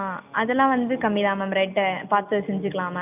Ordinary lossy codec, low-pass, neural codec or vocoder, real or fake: none; 3.6 kHz; none; real